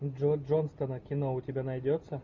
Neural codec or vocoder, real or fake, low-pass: none; real; 7.2 kHz